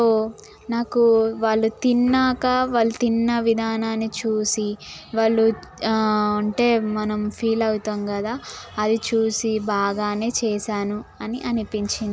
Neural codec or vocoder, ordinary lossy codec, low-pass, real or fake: none; none; none; real